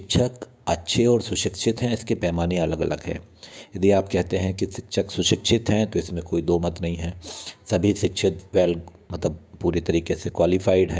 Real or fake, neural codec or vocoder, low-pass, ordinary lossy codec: fake; codec, 16 kHz, 6 kbps, DAC; none; none